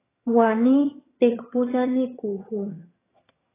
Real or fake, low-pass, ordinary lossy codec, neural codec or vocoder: fake; 3.6 kHz; AAC, 16 kbps; vocoder, 22.05 kHz, 80 mel bands, HiFi-GAN